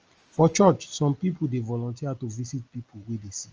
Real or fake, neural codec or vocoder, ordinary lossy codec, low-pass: real; none; Opus, 24 kbps; 7.2 kHz